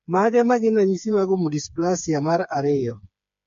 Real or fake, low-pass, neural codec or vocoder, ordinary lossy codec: fake; 7.2 kHz; codec, 16 kHz, 4 kbps, FreqCodec, smaller model; MP3, 48 kbps